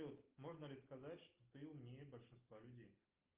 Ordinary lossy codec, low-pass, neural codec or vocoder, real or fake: Opus, 32 kbps; 3.6 kHz; none; real